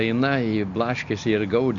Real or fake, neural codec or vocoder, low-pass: real; none; 7.2 kHz